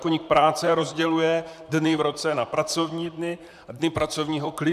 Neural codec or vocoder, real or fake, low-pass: vocoder, 44.1 kHz, 128 mel bands, Pupu-Vocoder; fake; 14.4 kHz